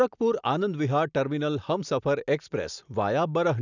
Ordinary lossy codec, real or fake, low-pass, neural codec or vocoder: none; real; 7.2 kHz; none